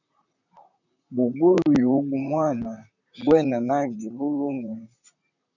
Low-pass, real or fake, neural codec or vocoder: 7.2 kHz; fake; vocoder, 44.1 kHz, 128 mel bands, Pupu-Vocoder